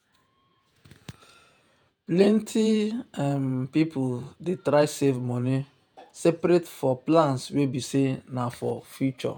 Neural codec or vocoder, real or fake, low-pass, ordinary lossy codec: vocoder, 48 kHz, 128 mel bands, Vocos; fake; none; none